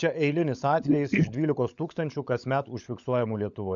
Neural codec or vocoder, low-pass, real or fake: codec, 16 kHz, 16 kbps, FunCodec, trained on LibriTTS, 50 frames a second; 7.2 kHz; fake